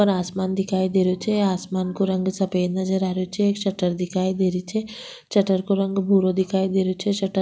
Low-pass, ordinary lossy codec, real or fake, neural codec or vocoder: none; none; real; none